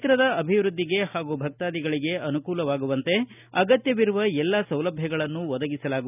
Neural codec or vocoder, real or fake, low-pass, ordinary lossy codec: none; real; 3.6 kHz; none